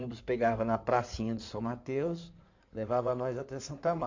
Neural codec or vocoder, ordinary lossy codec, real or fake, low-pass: codec, 16 kHz in and 24 kHz out, 2.2 kbps, FireRedTTS-2 codec; MP3, 48 kbps; fake; 7.2 kHz